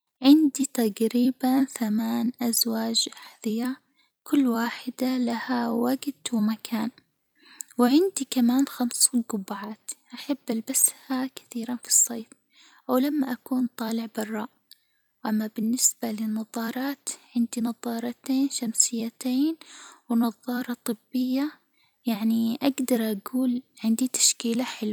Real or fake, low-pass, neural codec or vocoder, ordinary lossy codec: fake; none; vocoder, 44.1 kHz, 128 mel bands every 256 samples, BigVGAN v2; none